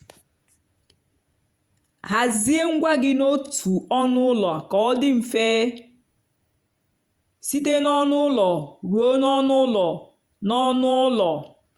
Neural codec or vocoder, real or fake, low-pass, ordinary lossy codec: vocoder, 48 kHz, 128 mel bands, Vocos; fake; 19.8 kHz; Opus, 64 kbps